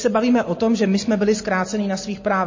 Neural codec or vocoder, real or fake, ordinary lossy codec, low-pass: none; real; MP3, 32 kbps; 7.2 kHz